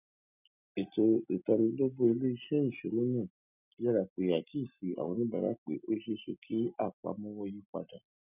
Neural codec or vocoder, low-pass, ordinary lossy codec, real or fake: none; 3.6 kHz; none; real